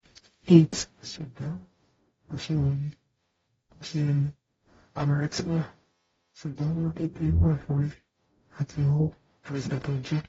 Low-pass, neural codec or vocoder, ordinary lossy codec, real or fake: 19.8 kHz; codec, 44.1 kHz, 0.9 kbps, DAC; AAC, 24 kbps; fake